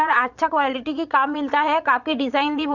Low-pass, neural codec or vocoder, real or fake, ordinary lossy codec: 7.2 kHz; vocoder, 22.05 kHz, 80 mel bands, Vocos; fake; none